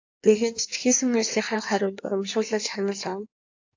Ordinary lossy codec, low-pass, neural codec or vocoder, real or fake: AAC, 48 kbps; 7.2 kHz; codec, 16 kHz in and 24 kHz out, 1.1 kbps, FireRedTTS-2 codec; fake